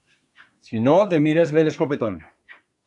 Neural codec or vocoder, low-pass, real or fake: codec, 24 kHz, 1 kbps, SNAC; 10.8 kHz; fake